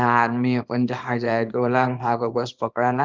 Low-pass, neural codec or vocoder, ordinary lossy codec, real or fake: 7.2 kHz; codec, 24 kHz, 0.9 kbps, WavTokenizer, small release; Opus, 32 kbps; fake